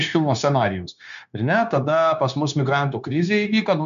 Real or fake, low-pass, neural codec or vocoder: fake; 7.2 kHz; codec, 16 kHz, 0.9 kbps, LongCat-Audio-Codec